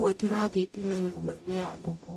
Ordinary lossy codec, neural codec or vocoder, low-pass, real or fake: MP3, 64 kbps; codec, 44.1 kHz, 0.9 kbps, DAC; 14.4 kHz; fake